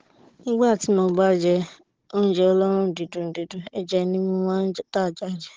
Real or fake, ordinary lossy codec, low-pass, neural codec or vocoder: fake; Opus, 16 kbps; 7.2 kHz; codec, 16 kHz, 16 kbps, FunCodec, trained on Chinese and English, 50 frames a second